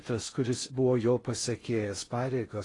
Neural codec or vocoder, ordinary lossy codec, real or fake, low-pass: codec, 16 kHz in and 24 kHz out, 0.6 kbps, FocalCodec, streaming, 4096 codes; AAC, 32 kbps; fake; 10.8 kHz